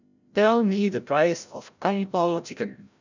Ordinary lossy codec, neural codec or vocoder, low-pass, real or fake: none; codec, 16 kHz, 0.5 kbps, FreqCodec, larger model; 7.2 kHz; fake